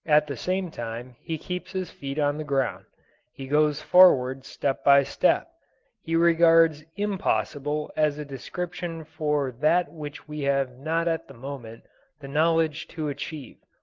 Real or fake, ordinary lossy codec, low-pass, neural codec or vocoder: real; Opus, 16 kbps; 7.2 kHz; none